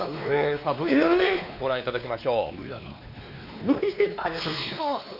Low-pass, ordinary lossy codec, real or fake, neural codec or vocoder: 5.4 kHz; none; fake; codec, 16 kHz, 2 kbps, X-Codec, WavLM features, trained on Multilingual LibriSpeech